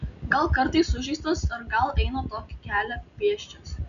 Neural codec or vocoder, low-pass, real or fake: none; 7.2 kHz; real